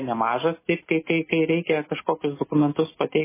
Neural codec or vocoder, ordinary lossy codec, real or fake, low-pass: none; MP3, 16 kbps; real; 3.6 kHz